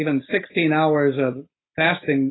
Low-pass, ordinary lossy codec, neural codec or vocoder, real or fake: 7.2 kHz; AAC, 16 kbps; none; real